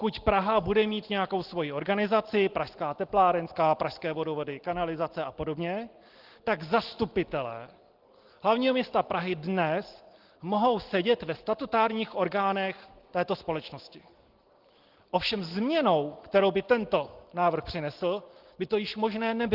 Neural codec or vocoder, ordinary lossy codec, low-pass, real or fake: none; Opus, 16 kbps; 5.4 kHz; real